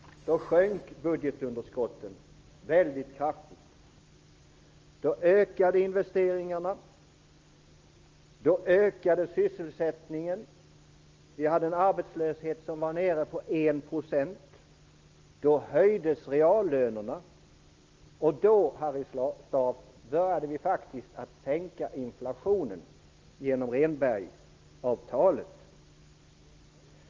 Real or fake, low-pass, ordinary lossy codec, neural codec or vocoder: real; 7.2 kHz; Opus, 24 kbps; none